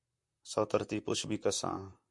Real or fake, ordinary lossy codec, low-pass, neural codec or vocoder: fake; MP3, 64 kbps; 10.8 kHz; vocoder, 44.1 kHz, 128 mel bands, Pupu-Vocoder